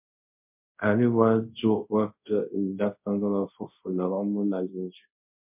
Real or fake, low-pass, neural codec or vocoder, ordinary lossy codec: fake; 3.6 kHz; codec, 24 kHz, 0.5 kbps, DualCodec; MP3, 32 kbps